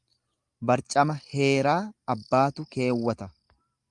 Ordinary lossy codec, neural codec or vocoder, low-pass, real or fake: Opus, 32 kbps; none; 10.8 kHz; real